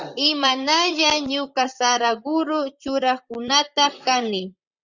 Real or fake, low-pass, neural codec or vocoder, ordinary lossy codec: fake; 7.2 kHz; vocoder, 44.1 kHz, 128 mel bands, Pupu-Vocoder; Opus, 64 kbps